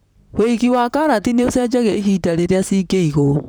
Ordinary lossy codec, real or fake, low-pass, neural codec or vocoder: none; fake; none; vocoder, 44.1 kHz, 128 mel bands, Pupu-Vocoder